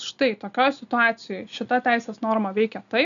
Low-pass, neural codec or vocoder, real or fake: 7.2 kHz; none; real